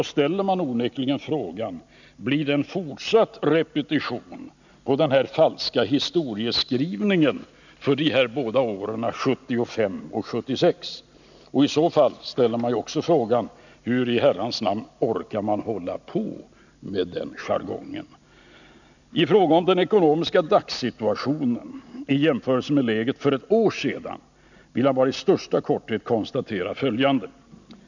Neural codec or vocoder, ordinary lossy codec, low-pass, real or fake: none; none; 7.2 kHz; real